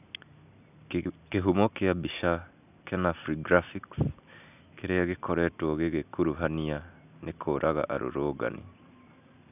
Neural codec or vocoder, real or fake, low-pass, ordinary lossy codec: none; real; 3.6 kHz; none